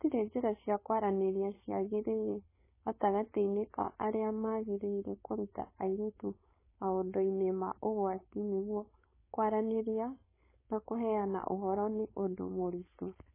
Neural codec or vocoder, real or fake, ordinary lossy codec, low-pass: codec, 16 kHz, 4.8 kbps, FACodec; fake; MP3, 16 kbps; 3.6 kHz